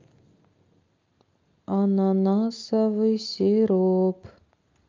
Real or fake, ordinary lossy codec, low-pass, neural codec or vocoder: real; Opus, 24 kbps; 7.2 kHz; none